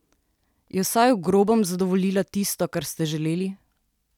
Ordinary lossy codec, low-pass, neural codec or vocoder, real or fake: none; 19.8 kHz; none; real